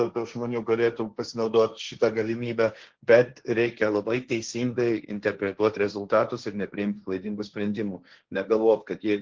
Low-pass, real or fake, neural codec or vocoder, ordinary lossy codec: 7.2 kHz; fake; codec, 16 kHz, 1.1 kbps, Voila-Tokenizer; Opus, 16 kbps